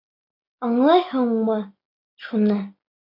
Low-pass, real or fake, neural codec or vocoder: 5.4 kHz; real; none